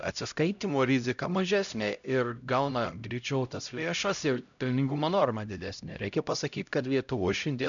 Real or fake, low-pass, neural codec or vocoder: fake; 7.2 kHz; codec, 16 kHz, 0.5 kbps, X-Codec, HuBERT features, trained on LibriSpeech